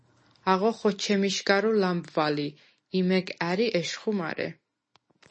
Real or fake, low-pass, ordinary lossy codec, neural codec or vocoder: real; 9.9 kHz; MP3, 32 kbps; none